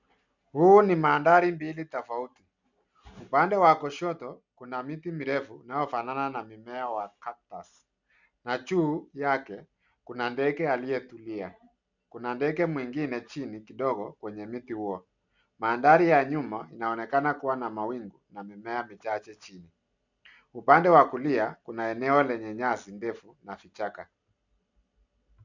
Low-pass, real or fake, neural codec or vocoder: 7.2 kHz; real; none